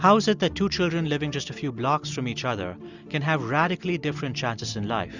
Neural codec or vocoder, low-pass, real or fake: none; 7.2 kHz; real